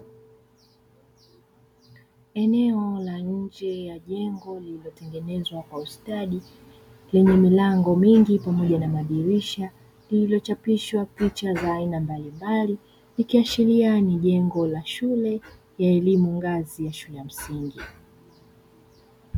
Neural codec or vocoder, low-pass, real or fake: none; 19.8 kHz; real